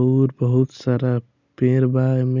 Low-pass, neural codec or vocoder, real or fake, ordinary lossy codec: 7.2 kHz; none; real; none